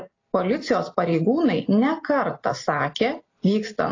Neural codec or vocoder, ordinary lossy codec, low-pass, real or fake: none; AAC, 32 kbps; 7.2 kHz; real